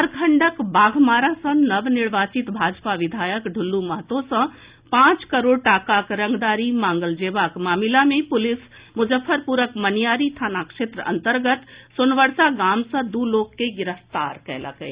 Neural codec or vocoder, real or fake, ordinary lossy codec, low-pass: none; real; Opus, 64 kbps; 3.6 kHz